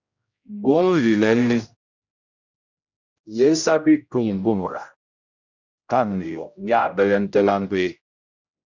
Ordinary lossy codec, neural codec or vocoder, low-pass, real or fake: Opus, 64 kbps; codec, 16 kHz, 0.5 kbps, X-Codec, HuBERT features, trained on general audio; 7.2 kHz; fake